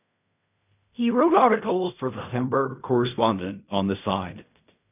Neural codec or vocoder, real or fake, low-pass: codec, 16 kHz in and 24 kHz out, 0.4 kbps, LongCat-Audio-Codec, fine tuned four codebook decoder; fake; 3.6 kHz